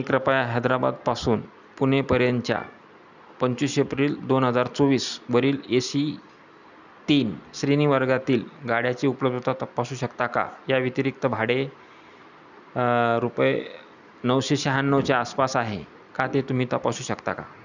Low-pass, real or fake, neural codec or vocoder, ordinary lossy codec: 7.2 kHz; real; none; none